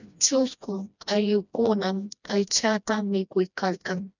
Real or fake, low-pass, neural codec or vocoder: fake; 7.2 kHz; codec, 16 kHz, 1 kbps, FreqCodec, smaller model